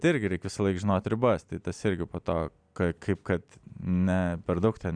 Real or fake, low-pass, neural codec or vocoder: real; 9.9 kHz; none